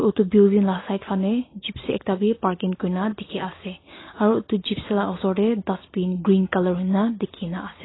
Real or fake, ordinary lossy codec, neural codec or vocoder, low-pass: real; AAC, 16 kbps; none; 7.2 kHz